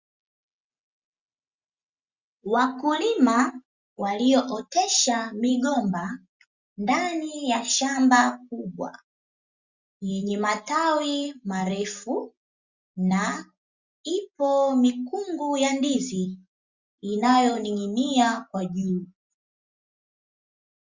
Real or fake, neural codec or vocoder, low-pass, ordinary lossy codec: real; none; 7.2 kHz; Opus, 64 kbps